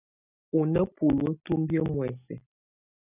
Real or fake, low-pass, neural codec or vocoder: real; 3.6 kHz; none